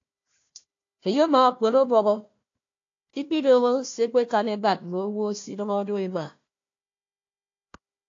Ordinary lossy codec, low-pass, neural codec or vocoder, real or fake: AAC, 48 kbps; 7.2 kHz; codec, 16 kHz, 1 kbps, FunCodec, trained on Chinese and English, 50 frames a second; fake